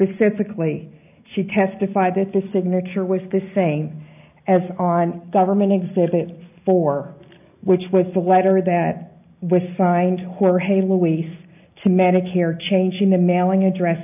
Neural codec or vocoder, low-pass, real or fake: none; 3.6 kHz; real